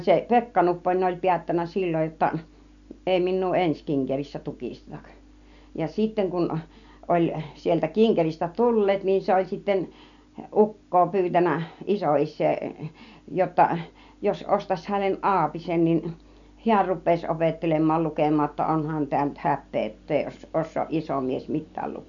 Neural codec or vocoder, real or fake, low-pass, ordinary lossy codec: none; real; 7.2 kHz; none